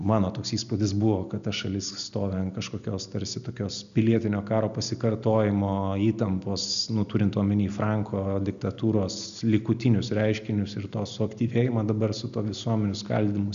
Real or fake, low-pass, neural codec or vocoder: real; 7.2 kHz; none